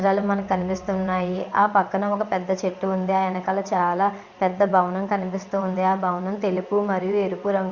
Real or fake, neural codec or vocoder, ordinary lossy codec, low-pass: fake; vocoder, 22.05 kHz, 80 mel bands, WaveNeXt; Opus, 64 kbps; 7.2 kHz